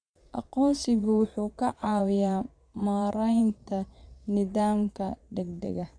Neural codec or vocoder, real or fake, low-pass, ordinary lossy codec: vocoder, 22.05 kHz, 80 mel bands, Vocos; fake; 9.9 kHz; none